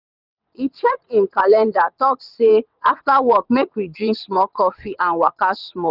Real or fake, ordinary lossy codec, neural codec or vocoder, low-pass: fake; none; vocoder, 22.05 kHz, 80 mel bands, WaveNeXt; 5.4 kHz